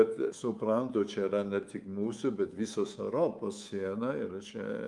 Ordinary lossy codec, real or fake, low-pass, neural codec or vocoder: Opus, 32 kbps; fake; 10.8 kHz; codec, 24 kHz, 3.1 kbps, DualCodec